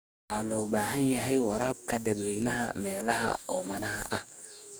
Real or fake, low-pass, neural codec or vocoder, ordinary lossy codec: fake; none; codec, 44.1 kHz, 2.6 kbps, DAC; none